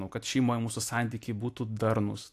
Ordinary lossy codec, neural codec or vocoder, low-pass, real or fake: AAC, 64 kbps; none; 14.4 kHz; real